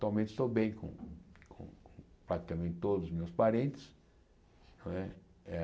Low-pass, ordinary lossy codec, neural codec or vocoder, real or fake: none; none; none; real